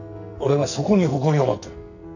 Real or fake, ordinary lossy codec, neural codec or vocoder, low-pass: fake; none; codec, 44.1 kHz, 2.6 kbps, SNAC; 7.2 kHz